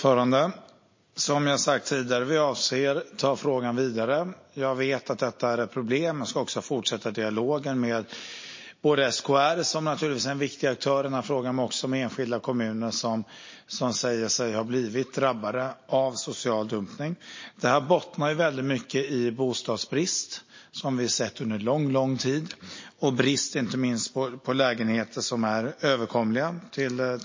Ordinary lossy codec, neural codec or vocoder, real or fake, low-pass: MP3, 32 kbps; none; real; 7.2 kHz